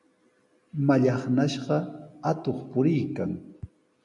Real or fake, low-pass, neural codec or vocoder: real; 10.8 kHz; none